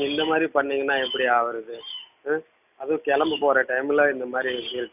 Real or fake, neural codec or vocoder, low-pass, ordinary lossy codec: real; none; 3.6 kHz; none